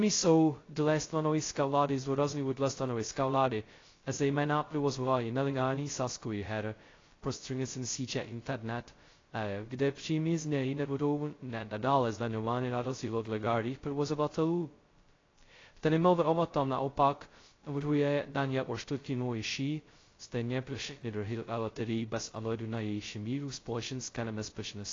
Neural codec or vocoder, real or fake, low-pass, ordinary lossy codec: codec, 16 kHz, 0.2 kbps, FocalCodec; fake; 7.2 kHz; AAC, 32 kbps